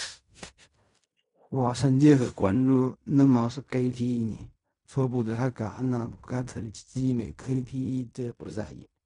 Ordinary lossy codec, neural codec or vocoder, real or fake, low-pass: none; codec, 16 kHz in and 24 kHz out, 0.4 kbps, LongCat-Audio-Codec, fine tuned four codebook decoder; fake; 10.8 kHz